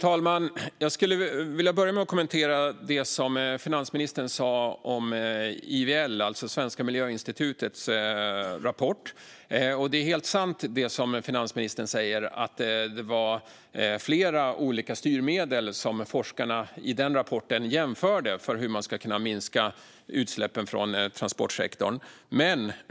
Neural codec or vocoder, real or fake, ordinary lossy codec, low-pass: none; real; none; none